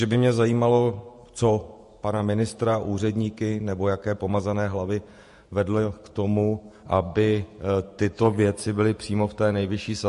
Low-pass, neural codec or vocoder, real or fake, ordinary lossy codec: 14.4 kHz; vocoder, 48 kHz, 128 mel bands, Vocos; fake; MP3, 48 kbps